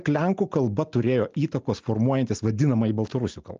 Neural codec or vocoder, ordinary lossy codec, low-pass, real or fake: none; Opus, 24 kbps; 7.2 kHz; real